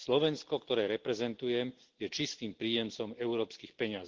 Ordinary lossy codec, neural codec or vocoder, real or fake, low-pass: Opus, 16 kbps; none; real; 7.2 kHz